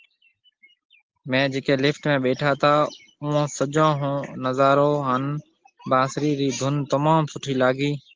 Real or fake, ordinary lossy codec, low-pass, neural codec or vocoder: real; Opus, 16 kbps; 7.2 kHz; none